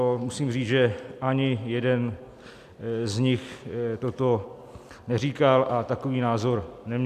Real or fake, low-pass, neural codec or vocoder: real; 14.4 kHz; none